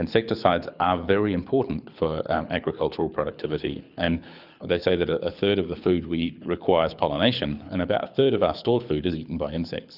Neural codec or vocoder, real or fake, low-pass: codec, 24 kHz, 6 kbps, HILCodec; fake; 5.4 kHz